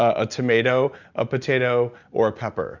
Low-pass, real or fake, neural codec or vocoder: 7.2 kHz; real; none